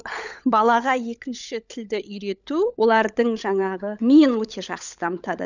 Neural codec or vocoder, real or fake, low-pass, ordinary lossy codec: codec, 16 kHz, 16 kbps, FunCodec, trained on LibriTTS, 50 frames a second; fake; 7.2 kHz; none